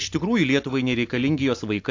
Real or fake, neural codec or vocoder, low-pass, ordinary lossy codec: real; none; 7.2 kHz; AAC, 48 kbps